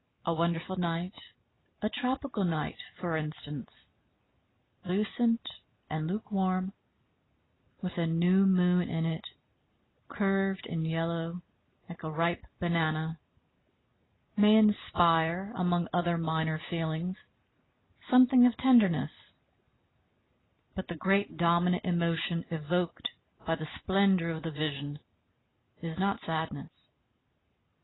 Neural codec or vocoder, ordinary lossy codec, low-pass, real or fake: none; AAC, 16 kbps; 7.2 kHz; real